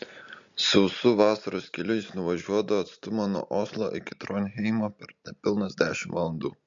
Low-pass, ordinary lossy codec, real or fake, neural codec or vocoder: 7.2 kHz; MP3, 48 kbps; real; none